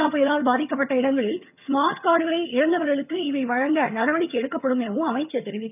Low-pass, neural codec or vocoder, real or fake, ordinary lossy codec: 3.6 kHz; vocoder, 22.05 kHz, 80 mel bands, HiFi-GAN; fake; none